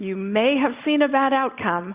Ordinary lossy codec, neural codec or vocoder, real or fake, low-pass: Opus, 64 kbps; none; real; 3.6 kHz